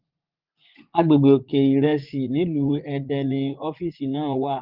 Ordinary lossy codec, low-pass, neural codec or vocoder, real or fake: Opus, 24 kbps; 5.4 kHz; vocoder, 44.1 kHz, 80 mel bands, Vocos; fake